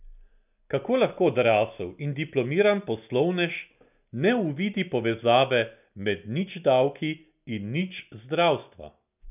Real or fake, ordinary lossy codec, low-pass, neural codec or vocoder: real; none; 3.6 kHz; none